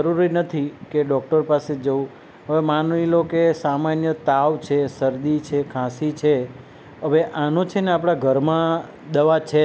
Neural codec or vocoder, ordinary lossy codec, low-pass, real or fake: none; none; none; real